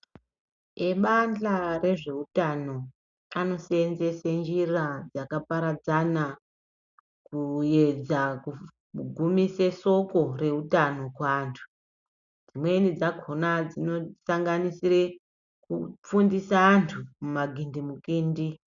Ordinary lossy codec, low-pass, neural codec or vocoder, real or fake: MP3, 96 kbps; 7.2 kHz; none; real